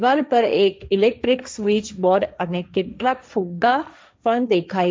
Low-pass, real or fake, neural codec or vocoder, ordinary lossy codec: none; fake; codec, 16 kHz, 1.1 kbps, Voila-Tokenizer; none